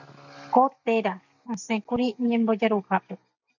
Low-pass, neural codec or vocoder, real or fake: 7.2 kHz; none; real